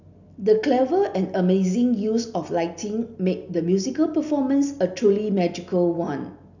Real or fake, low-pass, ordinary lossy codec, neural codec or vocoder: real; 7.2 kHz; none; none